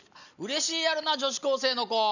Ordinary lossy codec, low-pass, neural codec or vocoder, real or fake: none; 7.2 kHz; none; real